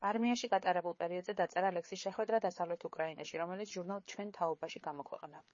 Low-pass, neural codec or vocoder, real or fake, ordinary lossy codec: 7.2 kHz; codec, 16 kHz, 4 kbps, FreqCodec, larger model; fake; MP3, 32 kbps